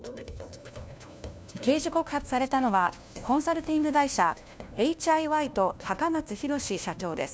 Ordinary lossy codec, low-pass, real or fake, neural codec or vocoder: none; none; fake; codec, 16 kHz, 1 kbps, FunCodec, trained on LibriTTS, 50 frames a second